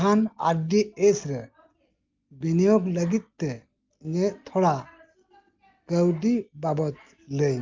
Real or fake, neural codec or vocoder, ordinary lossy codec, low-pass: real; none; Opus, 24 kbps; 7.2 kHz